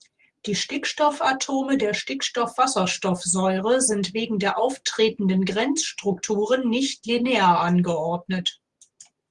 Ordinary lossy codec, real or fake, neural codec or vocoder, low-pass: Opus, 24 kbps; real; none; 10.8 kHz